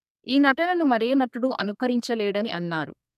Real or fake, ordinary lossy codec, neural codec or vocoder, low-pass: fake; none; codec, 32 kHz, 1.9 kbps, SNAC; 14.4 kHz